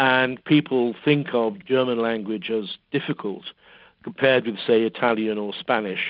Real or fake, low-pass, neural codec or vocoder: real; 5.4 kHz; none